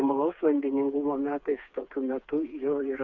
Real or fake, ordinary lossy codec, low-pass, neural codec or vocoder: fake; MP3, 64 kbps; 7.2 kHz; codec, 16 kHz, 4 kbps, FreqCodec, smaller model